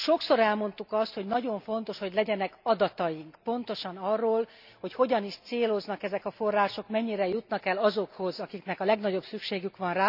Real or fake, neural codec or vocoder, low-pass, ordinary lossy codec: real; none; 5.4 kHz; none